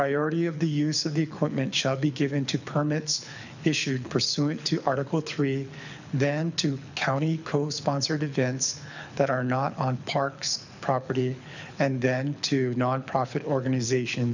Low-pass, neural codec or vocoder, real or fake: 7.2 kHz; codec, 24 kHz, 6 kbps, HILCodec; fake